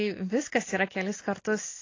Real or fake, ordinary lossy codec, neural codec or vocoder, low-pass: real; AAC, 32 kbps; none; 7.2 kHz